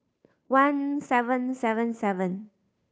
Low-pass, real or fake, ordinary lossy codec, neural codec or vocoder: none; fake; none; codec, 16 kHz, 2 kbps, FunCodec, trained on Chinese and English, 25 frames a second